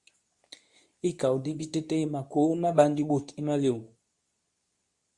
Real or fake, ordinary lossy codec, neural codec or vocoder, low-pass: fake; Opus, 64 kbps; codec, 24 kHz, 0.9 kbps, WavTokenizer, medium speech release version 2; 10.8 kHz